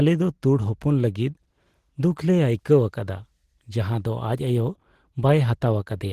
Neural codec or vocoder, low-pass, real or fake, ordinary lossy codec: none; 14.4 kHz; real; Opus, 16 kbps